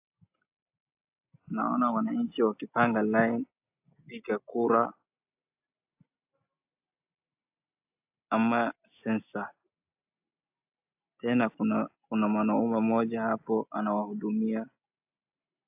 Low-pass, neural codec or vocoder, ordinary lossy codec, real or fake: 3.6 kHz; none; AAC, 32 kbps; real